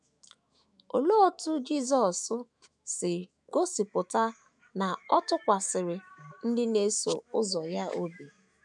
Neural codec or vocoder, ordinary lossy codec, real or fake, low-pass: autoencoder, 48 kHz, 128 numbers a frame, DAC-VAE, trained on Japanese speech; none; fake; 10.8 kHz